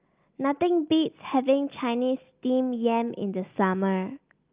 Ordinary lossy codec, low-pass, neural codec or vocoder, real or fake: Opus, 24 kbps; 3.6 kHz; none; real